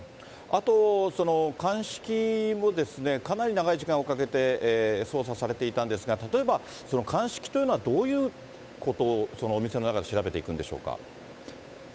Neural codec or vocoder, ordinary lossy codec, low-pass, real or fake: codec, 16 kHz, 8 kbps, FunCodec, trained on Chinese and English, 25 frames a second; none; none; fake